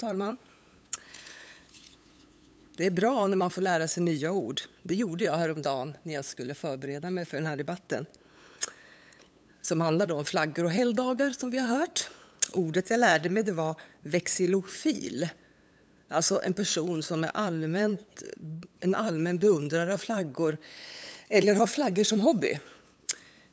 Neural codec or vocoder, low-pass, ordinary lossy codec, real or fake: codec, 16 kHz, 8 kbps, FunCodec, trained on LibriTTS, 25 frames a second; none; none; fake